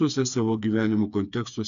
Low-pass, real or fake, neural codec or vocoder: 7.2 kHz; fake; codec, 16 kHz, 4 kbps, FreqCodec, smaller model